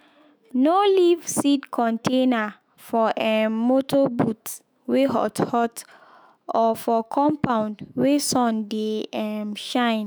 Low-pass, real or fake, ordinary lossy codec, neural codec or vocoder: none; fake; none; autoencoder, 48 kHz, 128 numbers a frame, DAC-VAE, trained on Japanese speech